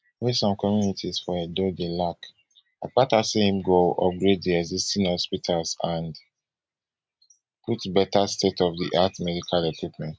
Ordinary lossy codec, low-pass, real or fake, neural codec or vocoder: none; none; real; none